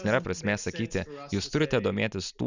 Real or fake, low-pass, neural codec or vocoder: real; 7.2 kHz; none